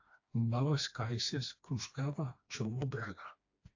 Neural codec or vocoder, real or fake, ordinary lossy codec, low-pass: codec, 16 kHz, 2 kbps, FreqCodec, smaller model; fake; AAC, 48 kbps; 7.2 kHz